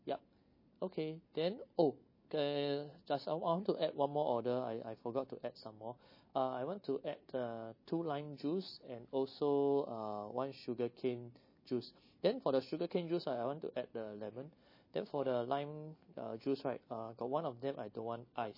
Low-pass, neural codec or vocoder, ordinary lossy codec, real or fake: 5.4 kHz; none; MP3, 24 kbps; real